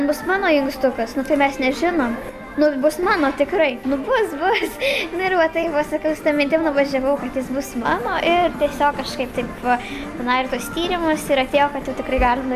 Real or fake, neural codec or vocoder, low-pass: real; none; 14.4 kHz